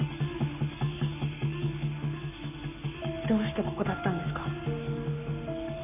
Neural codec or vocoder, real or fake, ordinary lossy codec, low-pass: codec, 44.1 kHz, 7.8 kbps, Pupu-Codec; fake; none; 3.6 kHz